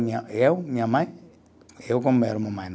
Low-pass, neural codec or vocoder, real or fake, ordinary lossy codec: none; none; real; none